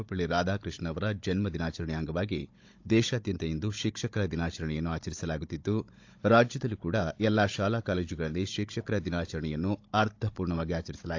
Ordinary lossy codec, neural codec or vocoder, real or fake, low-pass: AAC, 48 kbps; codec, 16 kHz, 16 kbps, FunCodec, trained on Chinese and English, 50 frames a second; fake; 7.2 kHz